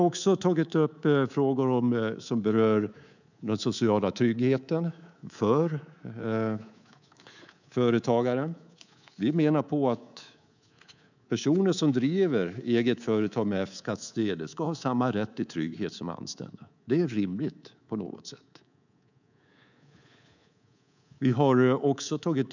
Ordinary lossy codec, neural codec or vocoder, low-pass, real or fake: none; codec, 24 kHz, 3.1 kbps, DualCodec; 7.2 kHz; fake